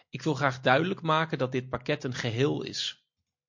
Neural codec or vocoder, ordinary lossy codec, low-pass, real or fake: none; MP3, 48 kbps; 7.2 kHz; real